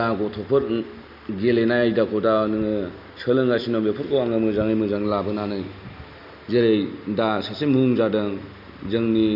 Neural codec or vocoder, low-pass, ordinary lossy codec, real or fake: none; 5.4 kHz; none; real